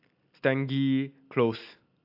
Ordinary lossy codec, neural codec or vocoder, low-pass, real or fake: AAC, 48 kbps; none; 5.4 kHz; real